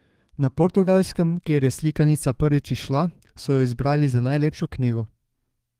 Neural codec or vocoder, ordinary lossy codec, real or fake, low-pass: codec, 32 kHz, 1.9 kbps, SNAC; Opus, 24 kbps; fake; 14.4 kHz